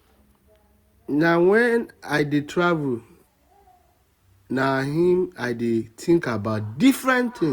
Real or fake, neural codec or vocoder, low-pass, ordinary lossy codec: real; none; 19.8 kHz; Opus, 64 kbps